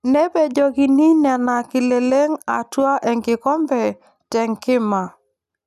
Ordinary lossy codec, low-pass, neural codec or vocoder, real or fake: none; 14.4 kHz; none; real